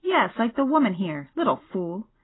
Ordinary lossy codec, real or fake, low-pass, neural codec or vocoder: AAC, 16 kbps; real; 7.2 kHz; none